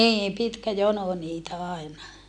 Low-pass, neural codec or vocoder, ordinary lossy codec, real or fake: 9.9 kHz; none; none; real